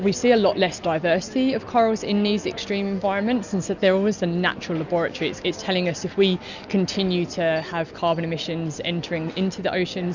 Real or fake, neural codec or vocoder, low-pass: real; none; 7.2 kHz